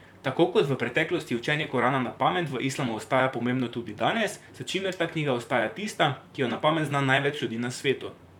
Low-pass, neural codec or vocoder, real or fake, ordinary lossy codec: 19.8 kHz; vocoder, 44.1 kHz, 128 mel bands, Pupu-Vocoder; fake; none